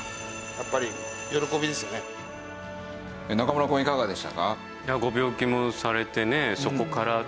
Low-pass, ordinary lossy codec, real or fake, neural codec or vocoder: none; none; real; none